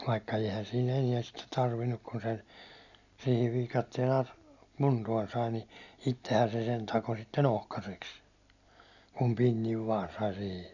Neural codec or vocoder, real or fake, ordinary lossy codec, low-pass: none; real; none; 7.2 kHz